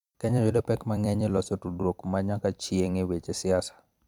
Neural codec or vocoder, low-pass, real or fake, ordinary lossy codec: vocoder, 44.1 kHz, 128 mel bands every 256 samples, BigVGAN v2; 19.8 kHz; fake; none